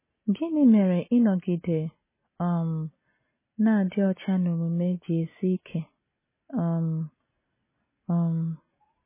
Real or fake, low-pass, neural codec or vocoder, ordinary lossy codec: real; 3.6 kHz; none; MP3, 16 kbps